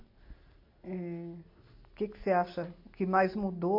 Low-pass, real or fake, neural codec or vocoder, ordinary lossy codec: 5.4 kHz; real; none; MP3, 32 kbps